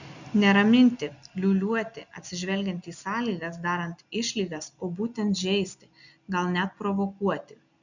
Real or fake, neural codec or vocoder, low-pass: real; none; 7.2 kHz